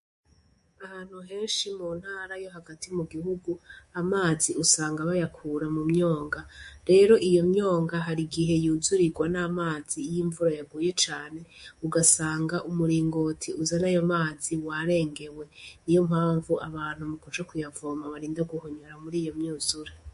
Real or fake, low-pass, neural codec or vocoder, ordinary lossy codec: fake; 10.8 kHz; codec, 24 kHz, 3.1 kbps, DualCodec; MP3, 48 kbps